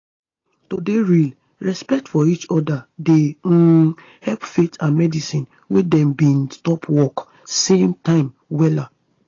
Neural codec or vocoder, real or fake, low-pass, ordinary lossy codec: none; real; 7.2 kHz; AAC, 32 kbps